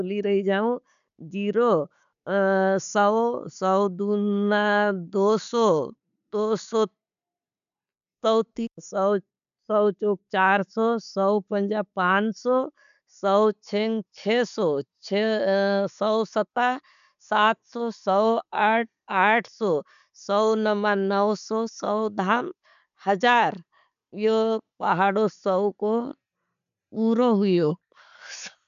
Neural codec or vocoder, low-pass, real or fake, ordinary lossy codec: none; 7.2 kHz; real; none